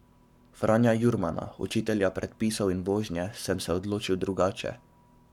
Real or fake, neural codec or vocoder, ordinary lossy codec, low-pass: fake; codec, 44.1 kHz, 7.8 kbps, Pupu-Codec; none; 19.8 kHz